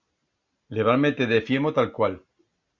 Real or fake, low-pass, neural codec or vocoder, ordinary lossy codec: real; 7.2 kHz; none; Opus, 64 kbps